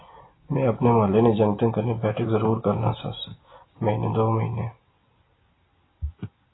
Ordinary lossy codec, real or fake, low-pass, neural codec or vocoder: AAC, 16 kbps; real; 7.2 kHz; none